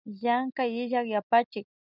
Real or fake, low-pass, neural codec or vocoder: real; 5.4 kHz; none